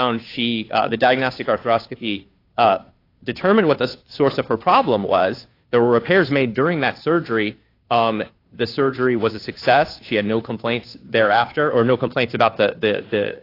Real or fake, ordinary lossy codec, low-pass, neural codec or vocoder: fake; AAC, 32 kbps; 5.4 kHz; codec, 16 kHz, 2 kbps, FunCodec, trained on Chinese and English, 25 frames a second